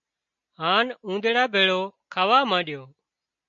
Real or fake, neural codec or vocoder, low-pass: real; none; 7.2 kHz